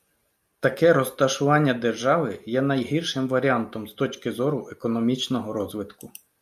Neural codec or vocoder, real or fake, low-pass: none; real; 14.4 kHz